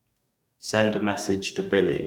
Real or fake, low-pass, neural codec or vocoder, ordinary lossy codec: fake; 19.8 kHz; codec, 44.1 kHz, 2.6 kbps, DAC; none